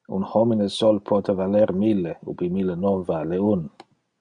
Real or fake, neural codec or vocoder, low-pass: real; none; 9.9 kHz